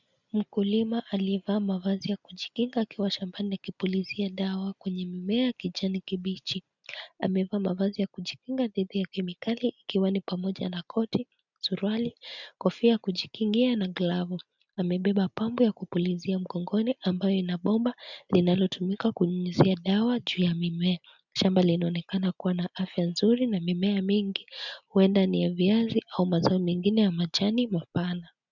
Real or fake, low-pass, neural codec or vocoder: real; 7.2 kHz; none